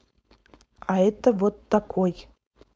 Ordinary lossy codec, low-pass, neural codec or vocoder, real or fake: none; none; codec, 16 kHz, 4.8 kbps, FACodec; fake